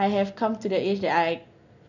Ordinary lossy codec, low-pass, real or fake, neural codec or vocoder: none; 7.2 kHz; real; none